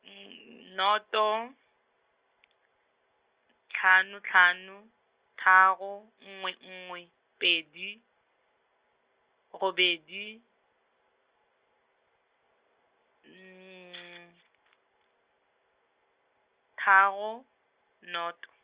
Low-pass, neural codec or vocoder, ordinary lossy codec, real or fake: 3.6 kHz; none; Opus, 24 kbps; real